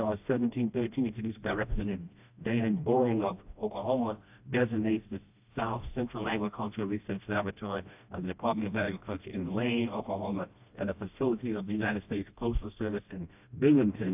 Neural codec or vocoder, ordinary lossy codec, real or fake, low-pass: codec, 16 kHz, 1 kbps, FreqCodec, smaller model; AAC, 32 kbps; fake; 3.6 kHz